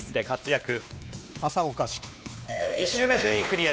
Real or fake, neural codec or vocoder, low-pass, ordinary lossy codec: fake; codec, 16 kHz, 2 kbps, X-Codec, WavLM features, trained on Multilingual LibriSpeech; none; none